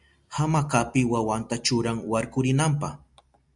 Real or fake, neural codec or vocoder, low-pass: real; none; 10.8 kHz